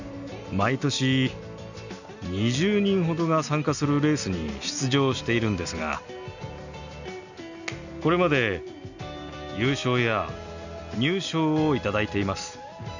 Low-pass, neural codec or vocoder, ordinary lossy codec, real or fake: 7.2 kHz; none; none; real